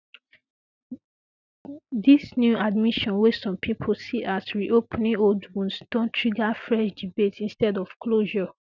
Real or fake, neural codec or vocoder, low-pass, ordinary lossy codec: real; none; 7.2 kHz; none